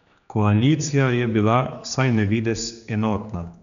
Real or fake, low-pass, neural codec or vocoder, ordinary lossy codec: fake; 7.2 kHz; codec, 16 kHz, 2 kbps, X-Codec, HuBERT features, trained on general audio; none